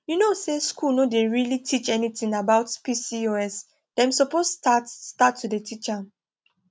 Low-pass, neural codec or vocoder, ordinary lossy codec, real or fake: none; none; none; real